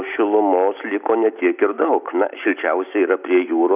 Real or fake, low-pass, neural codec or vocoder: real; 3.6 kHz; none